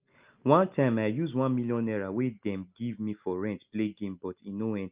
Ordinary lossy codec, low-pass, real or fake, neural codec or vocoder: Opus, 24 kbps; 3.6 kHz; real; none